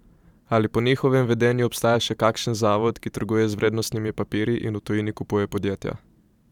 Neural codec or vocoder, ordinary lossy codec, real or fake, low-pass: vocoder, 44.1 kHz, 128 mel bands every 512 samples, BigVGAN v2; none; fake; 19.8 kHz